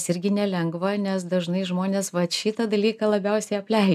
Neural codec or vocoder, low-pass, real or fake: none; 14.4 kHz; real